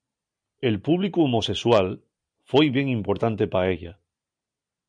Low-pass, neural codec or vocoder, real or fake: 9.9 kHz; none; real